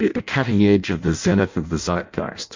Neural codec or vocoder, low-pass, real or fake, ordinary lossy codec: codec, 16 kHz in and 24 kHz out, 0.6 kbps, FireRedTTS-2 codec; 7.2 kHz; fake; MP3, 64 kbps